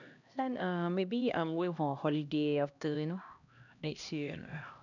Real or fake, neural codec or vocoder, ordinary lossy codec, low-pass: fake; codec, 16 kHz, 1 kbps, X-Codec, HuBERT features, trained on LibriSpeech; none; 7.2 kHz